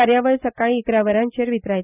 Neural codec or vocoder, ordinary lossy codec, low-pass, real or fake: none; none; 3.6 kHz; real